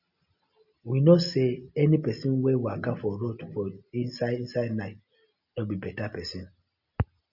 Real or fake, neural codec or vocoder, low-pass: real; none; 5.4 kHz